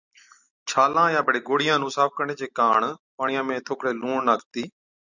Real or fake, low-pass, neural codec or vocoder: real; 7.2 kHz; none